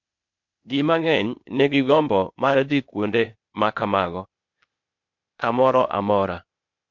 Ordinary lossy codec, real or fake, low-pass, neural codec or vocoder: MP3, 48 kbps; fake; 7.2 kHz; codec, 16 kHz, 0.8 kbps, ZipCodec